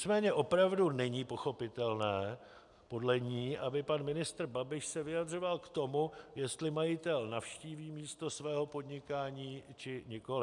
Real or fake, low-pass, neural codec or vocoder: real; 10.8 kHz; none